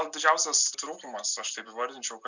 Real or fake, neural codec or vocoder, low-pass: real; none; 7.2 kHz